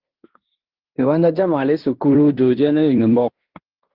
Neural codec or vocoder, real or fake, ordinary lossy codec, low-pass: codec, 16 kHz in and 24 kHz out, 0.9 kbps, LongCat-Audio-Codec, fine tuned four codebook decoder; fake; Opus, 16 kbps; 5.4 kHz